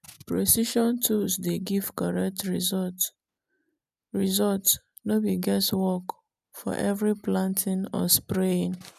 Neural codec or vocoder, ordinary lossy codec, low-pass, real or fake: none; none; 14.4 kHz; real